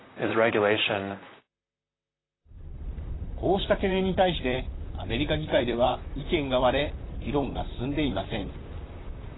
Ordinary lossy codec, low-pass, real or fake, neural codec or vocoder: AAC, 16 kbps; 7.2 kHz; fake; vocoder, 44.1 kHz, 128 mel bands, Pupu-Vocoder